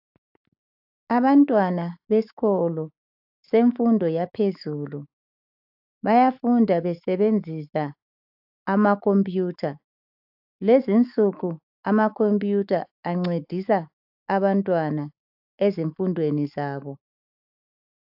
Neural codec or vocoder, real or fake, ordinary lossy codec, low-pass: autoencoder, 48 kHz, 128 numbers a frame, DAC-VAE, trained on Japanese speech; fake; AAC, 48 kbps; 5.4 kHz